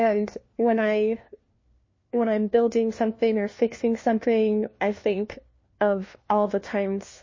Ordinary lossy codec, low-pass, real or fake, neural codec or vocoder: MP3, 32 kbps; 7.2 kHz; fake; codec, 16 kHz, 1 kbps, FunCodec, trained on LibriTTS, 50 frames a second